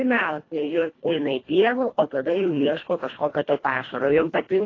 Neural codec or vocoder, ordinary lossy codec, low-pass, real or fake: codec, 24 kHz, 1.5 kbps, HILCodec; AAC, 32 kbps; 7.2 kHz; fake